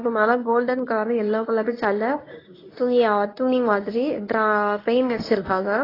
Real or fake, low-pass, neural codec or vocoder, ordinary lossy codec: fake; 5.4 kHz; codec, 24 kHz, 0.9 kbps, WavTokenizer, medium speech release version 1; AAC, 24 kbps